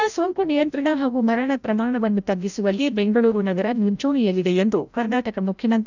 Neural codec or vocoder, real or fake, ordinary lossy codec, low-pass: codec, 16 kHz, 0.5 kbps, FreqCodec, larger model; fake; none; 7.2 kHz